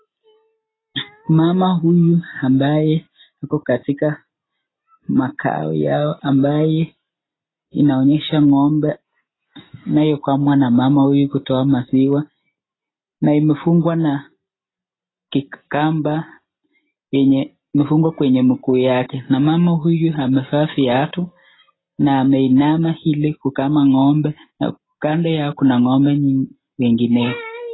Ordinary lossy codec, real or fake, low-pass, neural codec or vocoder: AAC, 16 kbps; real; 7.2 kHz; none